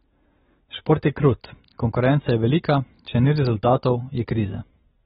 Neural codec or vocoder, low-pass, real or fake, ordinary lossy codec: none; 10.8 kHz; real; AAC, 16 kbps